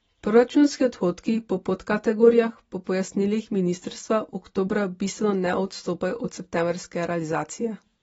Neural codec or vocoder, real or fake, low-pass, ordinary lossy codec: none; real; 10.8 kHz; AAC, 24 kbps